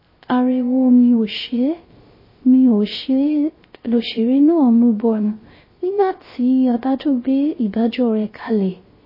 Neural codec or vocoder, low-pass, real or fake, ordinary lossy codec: codec, 16 kHz, 0.3 kbps, FocalCodec; 5.4 kHz; fake; MP3, 24 kbps